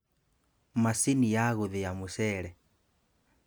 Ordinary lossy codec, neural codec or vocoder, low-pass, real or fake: none; none; none; real